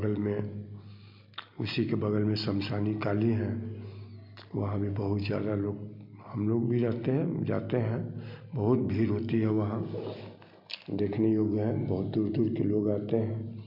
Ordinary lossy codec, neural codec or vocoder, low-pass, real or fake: MP3, 48 kbps; none; 5.4 kHz; real